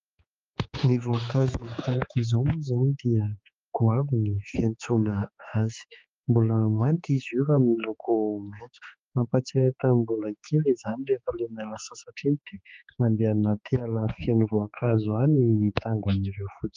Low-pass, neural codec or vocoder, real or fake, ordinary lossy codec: 7.2 kHz; codec, 16 kHz, 4 kbps, X-Codec, HuBERT features, trained on general audio; fake; Opus, 32 kbps